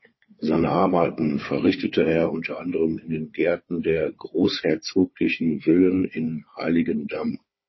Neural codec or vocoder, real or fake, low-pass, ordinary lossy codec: codec, 16 kHz, 4 kbps, FunCodec, trained on Chinese and English, 50 frames a second; fake; 7.2 kHz; MP3, 24 kbps